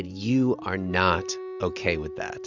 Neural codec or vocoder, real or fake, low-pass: none; real; 7.2 kHz